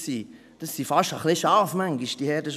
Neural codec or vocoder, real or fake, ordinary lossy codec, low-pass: none; real; none; 14.4 kHz